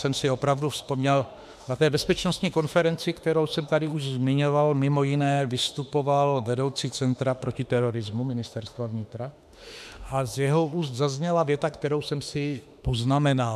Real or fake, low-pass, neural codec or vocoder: fake; 14.4 kHz; autoencoder, 48 kHz, 32 numbers a frame, DAC-VAE, trained on Japanese speech